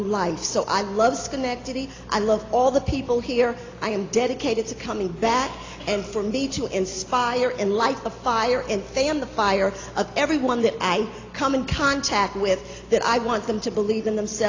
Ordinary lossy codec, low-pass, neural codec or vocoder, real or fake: AAC, 32 kbps; 7.2 kHz; none; real